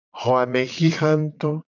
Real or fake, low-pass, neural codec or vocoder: fake; 7.2 kHz; vocoder, 22.05 kHz, 80 mel bands, Vocos